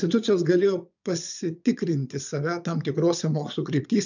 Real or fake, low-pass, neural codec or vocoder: fake; 7.2 kHz; vocoder, 22.05 kHz, 80 mel bands, Vocos